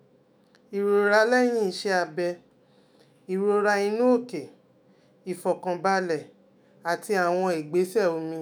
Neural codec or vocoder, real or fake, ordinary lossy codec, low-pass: autoencoder, 48 kHz, 128 numbers a frame, DAC-VAE, trained on Japanese speech; fake; none; none